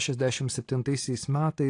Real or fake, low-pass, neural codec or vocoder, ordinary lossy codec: real; 9.9 kHz; none; AAC, 48 kbps